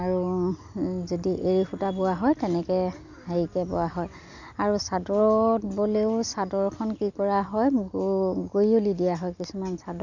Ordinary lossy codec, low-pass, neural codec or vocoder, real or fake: none; 7.2 kHz; none; real